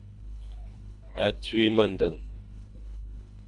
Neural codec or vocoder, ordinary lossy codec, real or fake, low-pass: codec, 24 kHz, 1.5 kbps, HILCodec; AAC, 64 kbps; fake; 10.8 kHz